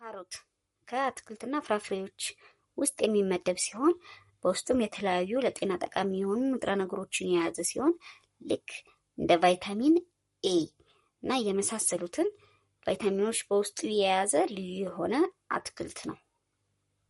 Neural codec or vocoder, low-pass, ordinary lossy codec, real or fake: codec, 44.1 kHz, 7.8 kbps, Pupu-Codec; 19.8 kHz; MP3, 48 kbps; fake